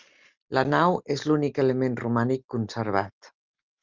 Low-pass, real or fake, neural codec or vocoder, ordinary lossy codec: 7.2 kHz; real; none; Opus, 32 kbps